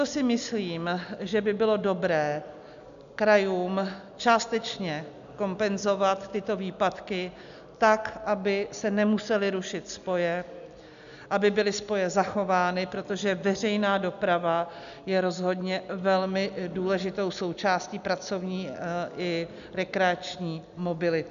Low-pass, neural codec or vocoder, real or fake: 7.2 kHz; none; real